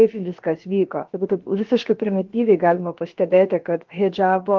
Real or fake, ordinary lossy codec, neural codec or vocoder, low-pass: fake; Opus, 16 kbps; codec, 16 kHz, about 1 kbps, DyCAST, with the encoder's durations; 7.2 kHz